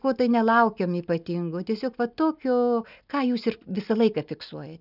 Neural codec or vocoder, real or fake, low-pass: none; real; 5.4 kHz